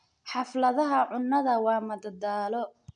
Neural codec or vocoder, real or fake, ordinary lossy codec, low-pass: none; real; none; 9.9 kHz